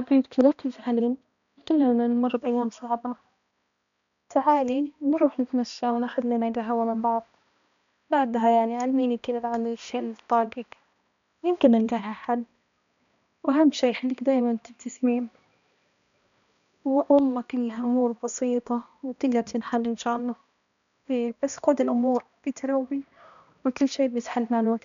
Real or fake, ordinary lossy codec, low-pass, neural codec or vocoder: fake; none; 7.2 kHz; codec, 16 kHz, 1 kbps, X-Codec, HuBERT features, trained on balanced general audio